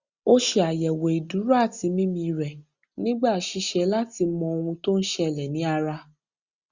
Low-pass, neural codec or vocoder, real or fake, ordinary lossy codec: 7.2 kHz; none; real; Opus, 64 kbps